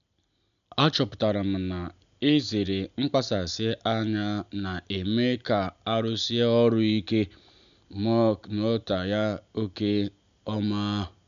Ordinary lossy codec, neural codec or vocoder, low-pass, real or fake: none; none; 7.2 kHz; real